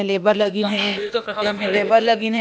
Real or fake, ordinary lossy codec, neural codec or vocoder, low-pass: fake; none; codec, 16 kHz, 0.8 kbps, ZipCodec; none